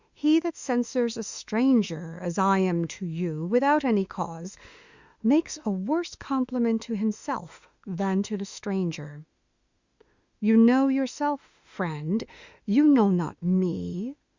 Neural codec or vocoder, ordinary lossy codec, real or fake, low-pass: autoencoder, 48 kHz, 32 numbers a frame, DAC-VAE, trained on Japanese speech; Opus, 64 kbps; fake; 7.2 kHz